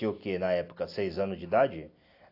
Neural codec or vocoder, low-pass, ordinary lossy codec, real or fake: none; 5.4 kHz; AAC, 32 kbps; real